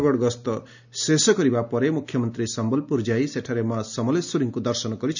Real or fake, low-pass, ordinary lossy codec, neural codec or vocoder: real; 7.2 kHz; none; none